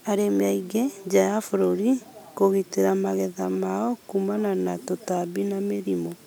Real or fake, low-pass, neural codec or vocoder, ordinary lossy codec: real; none; none; none